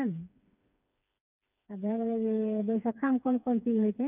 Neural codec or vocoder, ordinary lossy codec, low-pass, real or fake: codec, 16 kHz, 4 kbps, FreqCodec, smaller model; none; 3.6 kHz; fake